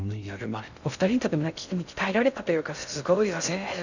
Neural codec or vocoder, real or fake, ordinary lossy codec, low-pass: codec, 16 kHz in and 24 kHz out, 0.6 kbps, FocalCodec, streaming, 2048 codes; fake; none; 7.2 kHz